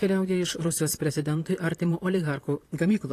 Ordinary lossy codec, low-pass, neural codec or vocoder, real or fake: AAC, 48 kbps; 14.4 kHz; vocoder, 44.1 kHz, 128 mel bands, Pupu-Vocoder; fake